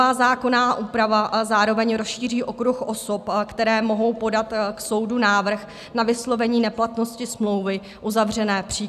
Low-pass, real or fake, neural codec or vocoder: 14.4 kHz; real; none